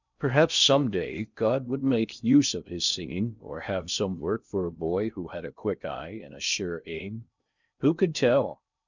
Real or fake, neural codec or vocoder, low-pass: fake; codec, 16 kHz in and 24 kHz out, 0.6 kbps, FocalCodec, streaming, 2048 codes; 7.2 kHz